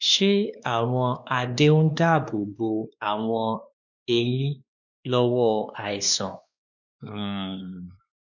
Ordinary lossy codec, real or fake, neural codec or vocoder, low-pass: none; fake; codec, 16 kHz, 2 kbps, X-Codec, WavLM features, trained on Multilingual LibriSpeech; 7.2 kHz